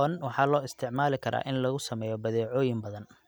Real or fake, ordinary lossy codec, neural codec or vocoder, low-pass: real; none; none; none